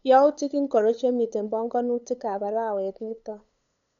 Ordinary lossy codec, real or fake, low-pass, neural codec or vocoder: none; fake; 7.2 kHz; codec, 16 kHz, 8 kbps, FunCodec, trained on LibriTTS, 25 frames a second